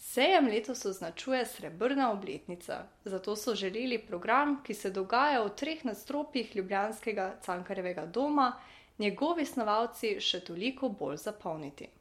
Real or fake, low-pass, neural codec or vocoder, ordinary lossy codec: real; 19.8 kHz; none; MP3, 64 kbps